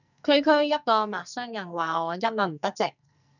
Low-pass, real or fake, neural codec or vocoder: 7.2 kHz; fake; codec, 44.1 kHz, 2.6 kbps, SNAC